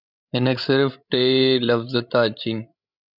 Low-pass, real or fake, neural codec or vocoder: 5.4 kHz; fake; codec, 16 kHz, 16 kbps, FreqCodec, larger model